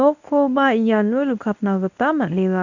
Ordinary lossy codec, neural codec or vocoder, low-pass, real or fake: none; codec, 24 kHz, 0.9 kbps, WavTokenizer, medium speech release version 1; 7.2 kHz; fake